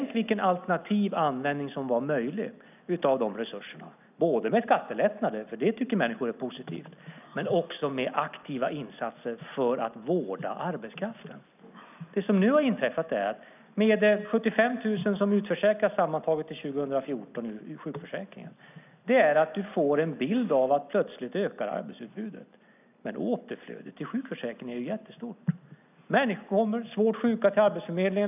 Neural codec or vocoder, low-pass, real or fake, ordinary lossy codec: none; 3.6 kHz; real; none